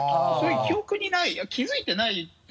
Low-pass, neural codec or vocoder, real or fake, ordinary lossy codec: none; none; real; none